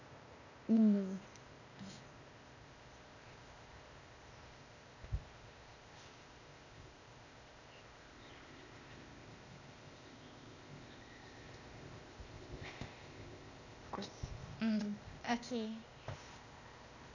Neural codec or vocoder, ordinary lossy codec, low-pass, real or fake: codec, 16 kHz, 0.8 kbps, ZipCodec; none; 7.2 kHz; fake